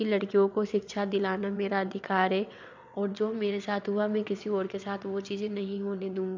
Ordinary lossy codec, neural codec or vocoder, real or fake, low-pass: MP3, 64 kbps; vocoder, 22.05 kHz, 80 mel bands, WaveNeXt; fake; 7.2 kHz